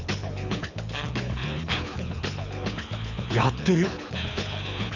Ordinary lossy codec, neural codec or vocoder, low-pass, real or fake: none; codec, 24 kHz, 6 kbps, HILCodec; 7.2 kHz; fake